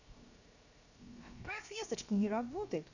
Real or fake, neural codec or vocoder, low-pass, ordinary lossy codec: fake; codec, 16 kHz, 0.7 kbps, FocalCodec; 7.2 kHz; none